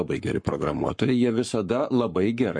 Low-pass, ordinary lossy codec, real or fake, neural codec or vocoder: 9.9 kHz; MP3, 48 kbps; fake; codec, 44.1 kHz, 7.8 kbps, Pupu-Codec